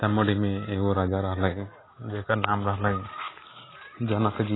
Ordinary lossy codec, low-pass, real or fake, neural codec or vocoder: AAC, 16 kbps; 7.2 kHz; real; none